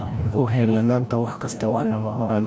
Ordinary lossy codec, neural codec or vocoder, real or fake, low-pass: none; codec, 16 kHz, 0.5 kbps, FreqCodec, larger model; fake; none